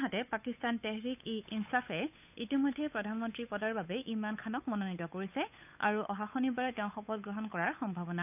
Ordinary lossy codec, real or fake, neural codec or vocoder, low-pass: none; fake; codec, 16 kHz, 8 kbps, FunCodec, trained on Chinese and English, 25 frames a second; 3.6 kHz